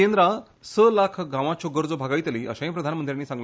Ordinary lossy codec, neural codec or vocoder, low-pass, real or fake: none; none; none; real